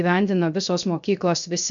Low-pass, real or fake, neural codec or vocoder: 7.2 kHz; fake; codec, 16 kHz, 0.3 kbps, FocalCodec